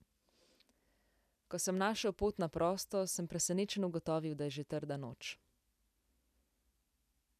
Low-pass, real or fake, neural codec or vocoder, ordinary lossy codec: 14.4 kHz; real; none; none